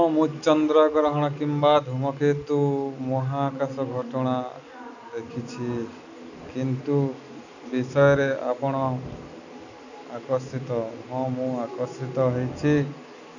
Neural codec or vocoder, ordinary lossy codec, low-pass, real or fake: none; none; 7.2 kHz; real